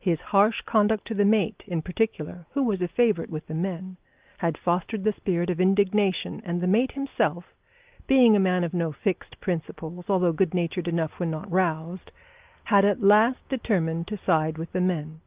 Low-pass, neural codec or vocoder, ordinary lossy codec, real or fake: 3.6 kHz; none; Opus, 24 kbps; real